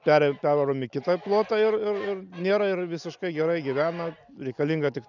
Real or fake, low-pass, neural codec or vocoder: real; 7.2 kHz; none